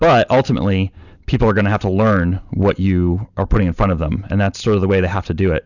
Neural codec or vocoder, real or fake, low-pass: none; real; 7.2 kHz